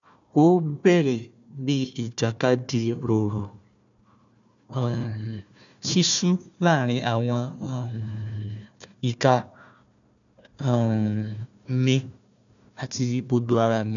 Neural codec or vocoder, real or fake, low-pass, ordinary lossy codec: codec, 16 kHz, 1 kbps, FunCodec, trained on Chinese and English, 50 frames a second; fake; 7.2 kHz; none